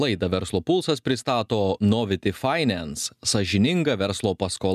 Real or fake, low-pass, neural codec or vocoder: real; 14.4 kHz; none